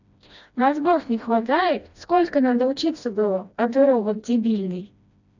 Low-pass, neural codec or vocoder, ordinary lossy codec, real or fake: 7.2 kHz; codec, 16 kHz, 1 kbps, FreqCodec, smaller model; none; fake